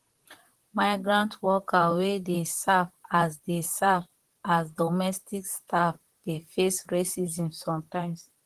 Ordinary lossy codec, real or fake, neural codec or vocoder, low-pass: Opus, 16 kbps; fake; vocoder, 44.1 kHz, 128 mel bands, Pupu-Vocoder; 14.4 kHz